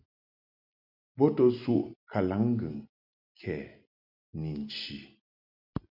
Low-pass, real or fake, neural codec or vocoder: 5.4 kHz; real; none